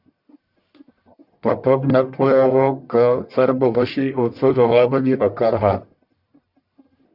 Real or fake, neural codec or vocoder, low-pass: fake; codec, 44.1 kHz, 1.7 kbps, Pupu-Codec; 5.4 kHz